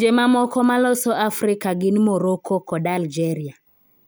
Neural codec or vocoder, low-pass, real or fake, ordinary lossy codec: none; none; real; none